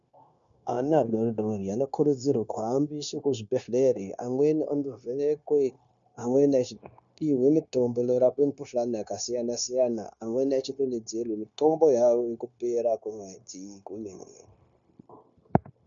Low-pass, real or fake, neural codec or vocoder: 7.2 kHz; fake; codec, 16 kHz, 0.9 kbps, LongCat-Audio-Codec